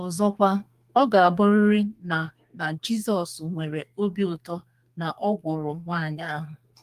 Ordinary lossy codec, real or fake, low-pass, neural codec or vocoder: Opus, 32 kbps; fake; 14.4 kHz; codec, 32 kHz, 1.9 kbps, SNAC